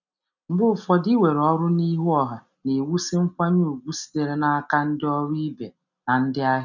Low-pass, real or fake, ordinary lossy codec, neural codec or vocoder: 7.2 kHz; real; none; none